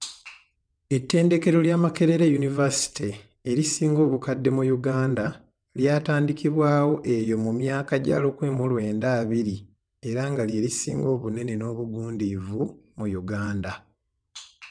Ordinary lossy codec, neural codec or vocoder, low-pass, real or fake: none; vocoder, 22.05 kHz, 80 mel bands, WaveNeXt; 9.9 kHz; fake